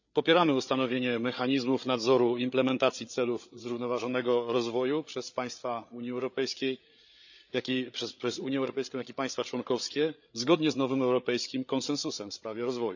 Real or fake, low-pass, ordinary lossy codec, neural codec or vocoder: fake; 7.2 kHz; none; codec, 16 kHz, 8 kbps, FreqCodec, larger model